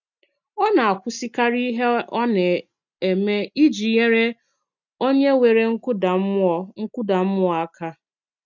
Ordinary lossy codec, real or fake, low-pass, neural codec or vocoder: none; real; 7.2 kHz; none